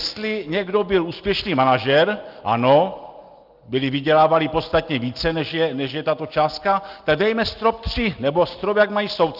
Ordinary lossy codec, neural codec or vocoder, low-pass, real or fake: Opus, 32 kbps; none; 5.4 kHz; real